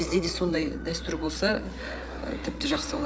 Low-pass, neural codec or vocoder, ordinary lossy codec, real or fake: none; codec, 16 kHz, 8 kbps, FreqCodec, larger model; none; fake